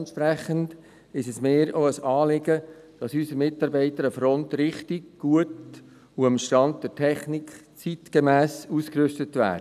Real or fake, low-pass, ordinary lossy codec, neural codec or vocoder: real; 14.4 kHz; none; none